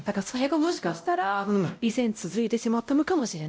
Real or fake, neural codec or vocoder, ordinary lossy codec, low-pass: fake; codec, 16 kHz, 0.5 kbps, X-Codec, WavLM features, trained on Multilingual LibriSpeech; none; none